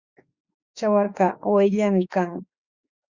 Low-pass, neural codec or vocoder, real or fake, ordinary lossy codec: 7.2 kHz; autoencoder, 48 kHz, 32 numbers a frame, DAC-VAE, trained on Japanese speech; fake; Opus, 64 kbps